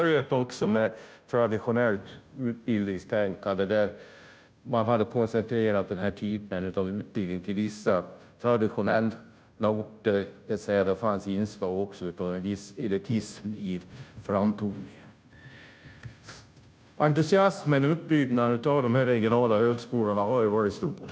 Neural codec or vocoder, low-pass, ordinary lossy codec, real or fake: codec, 16 kHz, 0.5 kbps, FunCodec, trained on Chinese and English, 25 frames a second; none; none; fake